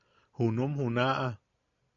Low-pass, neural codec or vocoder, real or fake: 7.2 kHz; none; real